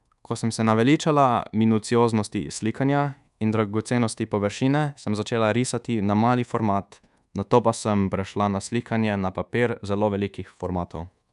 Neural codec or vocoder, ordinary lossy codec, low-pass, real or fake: codec, 24 kHz, 1.2 kbps, DualCodec; none; 10.8 kHz; fake